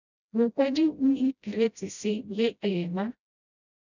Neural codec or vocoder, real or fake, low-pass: codec, 16 kHz, 0.5 kbps, FreqCodec, smaller model; fake; 7.2 kHz